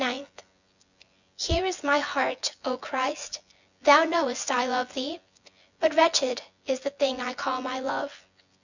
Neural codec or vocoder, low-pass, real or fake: vocoder, 24 kHz, 100 mel bands, Vocos; 7.2 kHz; fake